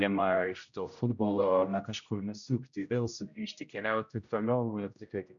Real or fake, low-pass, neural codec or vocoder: fake; 7.2 kHz; codec, 16 kHz, 0.5 kbps, X-Codec, HuBERT features, trained on general audio